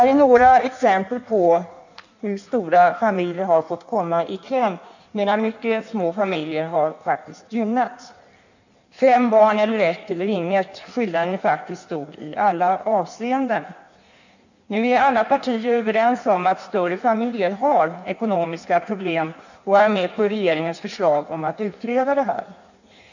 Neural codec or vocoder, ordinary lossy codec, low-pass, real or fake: codec, 16 kHz in and 24 kHz out, 1.1 kbps, FireRedTTS-2 codec; none; 7.2 kHz; fake